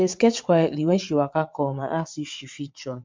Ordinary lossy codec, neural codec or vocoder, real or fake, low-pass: MP3, 64 kbps; vocoder, 22.05 kHz, 80 mel bands, WaveNeXt; fake; 7.2 kHz